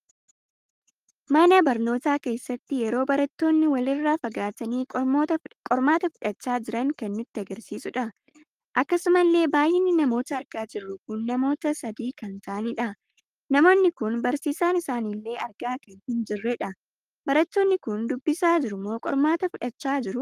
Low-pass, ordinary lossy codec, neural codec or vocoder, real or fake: 14.4 kHz; Opus, 32 kbps; codec, 44.1 kHz, 7.8 kbps, Pupu-Codec; fake